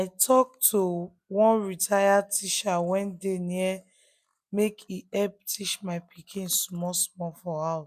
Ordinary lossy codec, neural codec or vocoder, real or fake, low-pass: none; none; real; 14.4 kHz